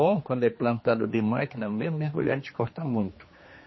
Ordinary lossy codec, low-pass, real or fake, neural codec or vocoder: MP3, 24 kbps; 7.2 kHz; fake; codec, 16 kHz, 2 kbps, X-Codec, HuBERT features, trained on general audio